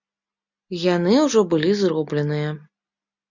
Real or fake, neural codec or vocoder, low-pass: real; none; 7.2 kHz